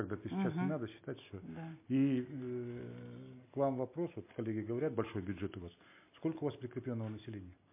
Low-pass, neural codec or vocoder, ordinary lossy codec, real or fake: 3.6 kHz; none; MP3, 24 kbps; real